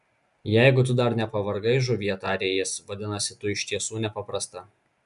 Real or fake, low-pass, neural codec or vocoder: real; 10.8 kHz; none